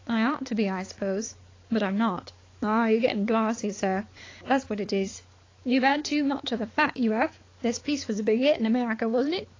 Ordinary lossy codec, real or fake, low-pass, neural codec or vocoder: AAC, 32 kbps; fake; 7.2 kHz; codec, 16 kHz, 4 kbps, X-Codec, HuBERT features, trained on balanced general audio